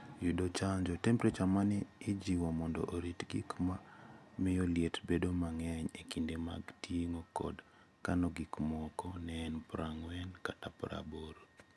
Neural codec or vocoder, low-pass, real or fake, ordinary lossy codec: none; none; real; none